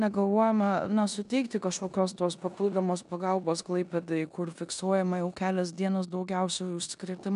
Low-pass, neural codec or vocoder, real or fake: 10.8 kHz; codec, 16 kHz in and 24 kHz out, 0.9 kbps, LongCat-Audio-Codec, four codebook decoder; fake